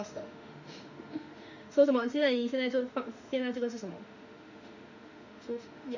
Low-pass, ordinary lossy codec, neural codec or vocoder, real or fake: 7.2 kHz; none; autoencoder, 48 kHz, 32 numbers a frame, DAC-VAE, trained on Japanese speech; fake